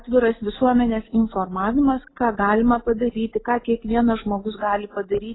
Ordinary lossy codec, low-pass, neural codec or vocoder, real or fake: AAC, 16 kbps; 7.2 kHz; none; real